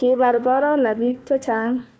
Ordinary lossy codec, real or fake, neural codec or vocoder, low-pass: none; fake; codec, 16 kHz, 1 kbps, FunCodec, trained on Chinese and English, 50 frames a second; none